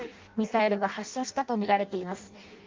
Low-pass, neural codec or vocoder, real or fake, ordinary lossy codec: 7.2 kHz; codec, 16 kHz in and 24 kHz out, 0.6 kbps, FireRedTTS-2 codec; fake; Opus, 24 kbps